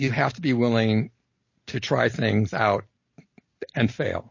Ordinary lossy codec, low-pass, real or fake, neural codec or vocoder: MP3, 32 kbps; 7.2 kHz; real; none